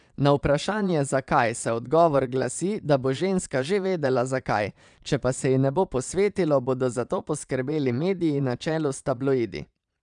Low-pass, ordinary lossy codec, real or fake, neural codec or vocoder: 9.9 kHz; none; fake; vocoder, 22.05 kHz, 80 mel bands, Vocos